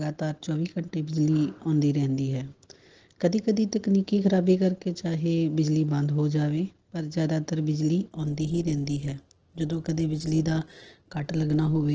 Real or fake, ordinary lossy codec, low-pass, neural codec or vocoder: real; Opus, 16 kbps; 7.2 kHz; none